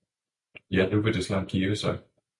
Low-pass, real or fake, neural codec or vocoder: 10.8 kHz; real; none